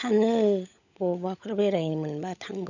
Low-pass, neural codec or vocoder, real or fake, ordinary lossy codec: 7.2 kHz; codec, 16 kHz, 16 kbps, FunCodec, trained on LibriTTS, 50 frames a second; fake; none